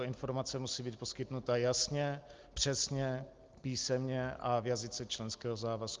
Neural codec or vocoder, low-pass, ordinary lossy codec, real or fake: none; 7.2 kHz; Opus, 32 kbps; real